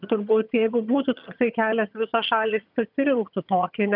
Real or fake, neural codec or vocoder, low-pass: fake; vocoder, 22.05 kHz, 80 mel bands, HiFi-GAN; 5.4 kHz